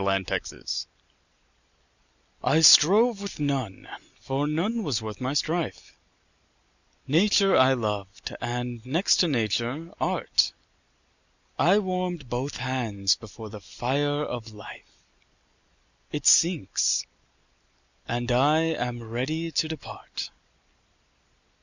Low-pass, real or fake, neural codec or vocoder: 7.2 kHz; real; none